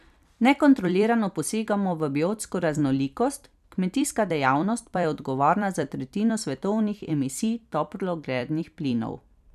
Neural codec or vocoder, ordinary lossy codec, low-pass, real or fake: vocoder, 44.1 kHz, 128 mel bands every 256 samples, BigVGAN v2; none; 14.4 kHz; fake